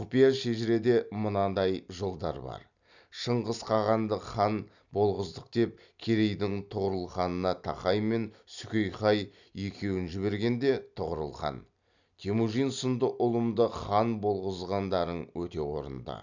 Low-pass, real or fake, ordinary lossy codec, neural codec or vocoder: 7.2 kHz; real; none; none